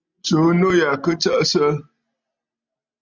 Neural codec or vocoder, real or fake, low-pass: none; real; 7.2 kHz